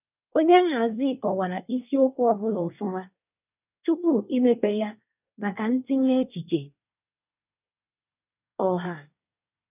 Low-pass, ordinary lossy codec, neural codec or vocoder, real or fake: 3.6 kHz; none; codec, 24 kHz, 1 kbps, SNAC; fake